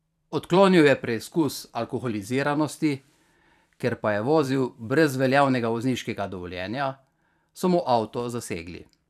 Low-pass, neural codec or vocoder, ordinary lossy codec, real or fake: 14.4 kHz; vocoder, 44.1 kHz, 128 mel bands every 512 samples, BigVGAN v2; none; fake